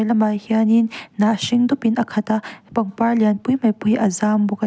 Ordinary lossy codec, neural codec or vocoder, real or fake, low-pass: none; none; real; none